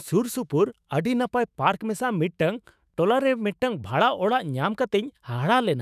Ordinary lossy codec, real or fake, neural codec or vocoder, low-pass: none; fake; autoencoder, 48 kHz, 128 numbers a frame, DAC-VAE, trained on Japanese speech; 14.4 kHz